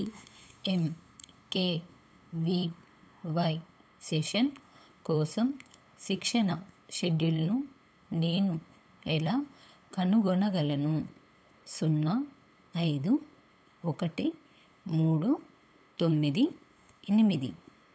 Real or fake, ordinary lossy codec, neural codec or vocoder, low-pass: fake; none; codec, 16 kHz, 8 kbps, FunCodec, trained on LibriTTS, 25 frames a second; none